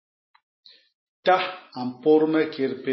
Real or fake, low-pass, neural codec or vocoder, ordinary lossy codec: real; 7.2 kHz; none; MP3, 24 kbps